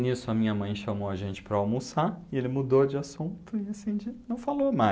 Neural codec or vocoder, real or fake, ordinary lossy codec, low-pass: none; real; none; none